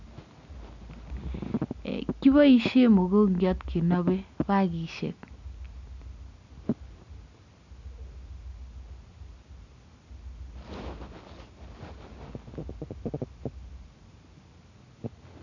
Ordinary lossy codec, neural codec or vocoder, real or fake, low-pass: none; none; real; 7.2 kHz